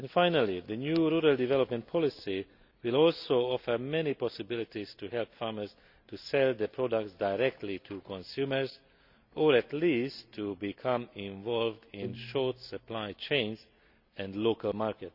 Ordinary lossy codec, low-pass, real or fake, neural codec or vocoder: none; 5.4 kHz; real; none